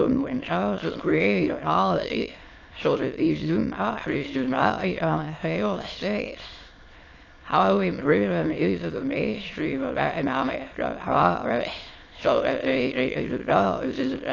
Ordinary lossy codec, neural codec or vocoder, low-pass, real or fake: AAC, 32 kbps; autoencoder, 22.05 kHz, a latent of 192 numbers a frame, VITS, trained on many speakers; 7.2 kHz; fake